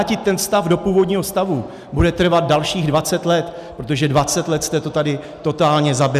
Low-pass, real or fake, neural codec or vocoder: 14.4 kHz; real; none